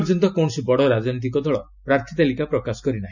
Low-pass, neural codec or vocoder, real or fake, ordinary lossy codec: 7.2 kHz; none; real; none